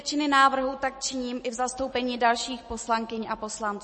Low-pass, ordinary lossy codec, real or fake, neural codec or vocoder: 10.8 kHz; MP3, 32 kbps; real; none